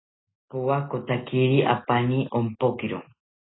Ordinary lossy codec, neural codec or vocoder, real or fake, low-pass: AAC, 16 kbps; none; real; 7.2 kHz